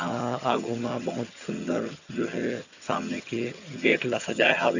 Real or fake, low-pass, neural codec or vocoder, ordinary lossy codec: fake; 7.2 kHz; vocoder, 22.05 kHz, 80 mel bands, HiFi-GAN; none